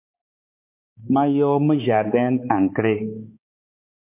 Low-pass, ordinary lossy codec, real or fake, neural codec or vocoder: 3.6 kHz; MP3, 24 kbps; fake; codec, 16 kHz, 4 kbps, X-Codec, HuBERT features, trained on balanced general audio